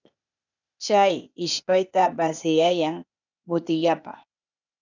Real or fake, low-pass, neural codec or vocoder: fake; 7.2 kHz; codec, 16 kHz, 0.8 kbps, ZipCodec